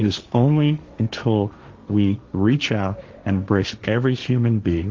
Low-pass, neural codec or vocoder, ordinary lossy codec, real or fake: 7.2 kHz; codec, 16 kHz, 1.1 kbps, Voila-Tokenizer; Opus, 32 kbps; fake